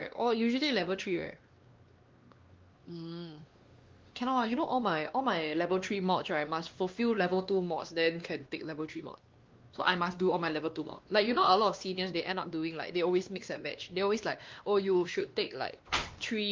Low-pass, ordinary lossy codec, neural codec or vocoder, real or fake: 7.2 kHz; Opus, 32 kbps; codec, 16 kHz, 2 kbps, X-Codec, WavLM features, trained on Multilingual LibriSpeech; fake